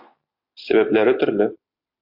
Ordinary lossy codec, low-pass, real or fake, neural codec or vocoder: AAC, 48 kbps; 5.4 kHz; real; none